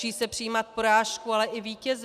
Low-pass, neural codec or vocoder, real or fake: 14.4 kHz; none; real